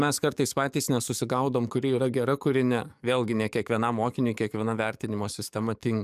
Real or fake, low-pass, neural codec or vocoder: fake; 14.4 kHz; codec, 44.1 kHz, 7.8 kbps, DAC